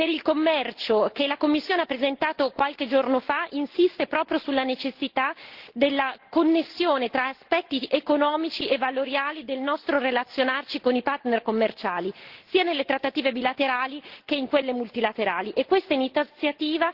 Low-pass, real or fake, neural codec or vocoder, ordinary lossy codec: 5.4 kHz; real; none; Opus, 16 kbps